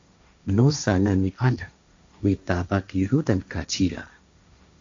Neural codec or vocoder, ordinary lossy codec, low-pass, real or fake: codec, 16 kHz, 1.1 kbps, Voila-Tokenizer; AAC, 64 kbps; 7.2 kHz; fake